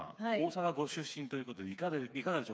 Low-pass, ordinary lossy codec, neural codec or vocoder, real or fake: none; none; codec, 16 kHz, 4 kbps, FreqCodec, smaller model; fake